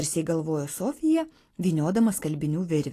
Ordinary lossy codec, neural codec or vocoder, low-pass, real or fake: AAC, 48 kbps; none; 14.4 kHz; real